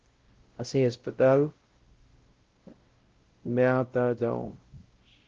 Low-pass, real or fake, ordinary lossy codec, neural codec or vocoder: 7.2 kHz; fake; Opus, 16 kbps; codec, 16 kHz, 0.5 kbps, X-Codec, WavLM features, trained on Multilingual LibriSpeech